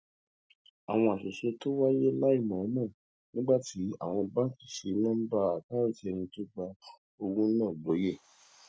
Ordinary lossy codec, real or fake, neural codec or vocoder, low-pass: none; real; none; none